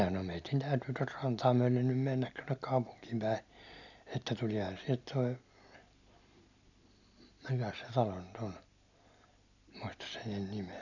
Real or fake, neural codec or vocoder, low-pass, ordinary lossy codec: real; none; 7.2 kHz; none